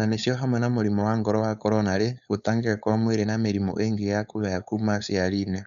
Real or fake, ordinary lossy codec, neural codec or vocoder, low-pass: fake; none; codec, 16 kHz, 4.8 kbps, FACodec; 7.2 kHz